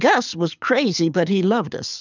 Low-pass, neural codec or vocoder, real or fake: 7.2 kHz; codec, 24 kHz, 6 kbps, HILCodec; fake